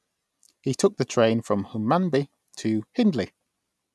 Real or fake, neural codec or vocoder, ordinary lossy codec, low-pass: real; none; none; none